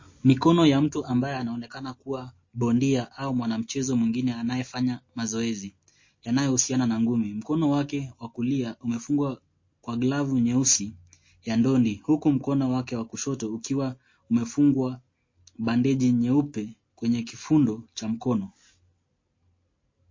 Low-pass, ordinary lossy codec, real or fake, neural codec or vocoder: 7.2 kHz; MP3, 32 kbps; real; none